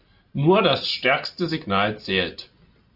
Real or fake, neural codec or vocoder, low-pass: real; none; 5.4 kHz